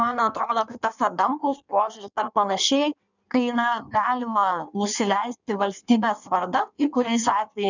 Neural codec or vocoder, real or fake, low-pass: codec, 16 kHz in and 24 kHz out, 1.1 kbps, FireRedTTS-2 codec; fake; 7.2 kHz